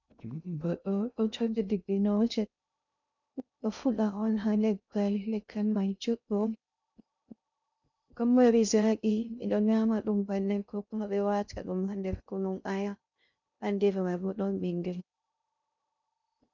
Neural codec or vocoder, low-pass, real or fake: codec, 16 kHz in and 24 kHz out, 0.6 kbps, FocalCodec, streaming, 2048 codes; 7.2 kHz; fake